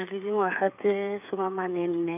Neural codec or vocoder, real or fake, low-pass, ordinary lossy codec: codec, 16 kHz, 8 kbps, FreqCodec, smaller model; fake; 3.6 kHz; none